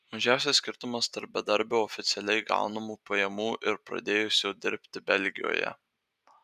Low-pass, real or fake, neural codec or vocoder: 14.4 kHz; real; none